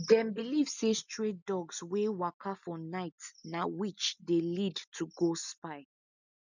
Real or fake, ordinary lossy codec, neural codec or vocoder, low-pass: real; none; none; 7.2 kHz